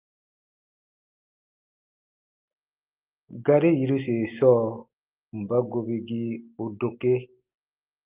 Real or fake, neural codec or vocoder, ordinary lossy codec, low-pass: real; none; Opus, 32 kbps; 3.6 kHz